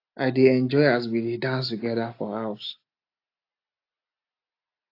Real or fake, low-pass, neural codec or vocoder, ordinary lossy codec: real; 5.4 kHz; none; AAC, 32 kbps